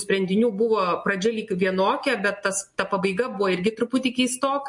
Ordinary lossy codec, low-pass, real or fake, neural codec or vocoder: MP3, 48 kbps; 10.8 kHz; real; none